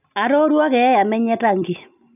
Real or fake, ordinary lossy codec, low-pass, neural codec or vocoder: real; none; 3.6 kHz; none